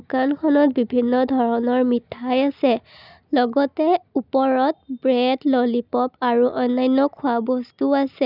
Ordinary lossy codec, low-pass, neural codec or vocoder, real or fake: none; 5.4 kHz; none; real